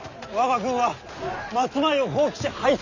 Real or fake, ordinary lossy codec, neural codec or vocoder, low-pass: real; none; none; 7.2 kHz